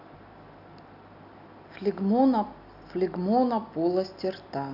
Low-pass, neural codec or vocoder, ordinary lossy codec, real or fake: 5.4 kHz; none; none; real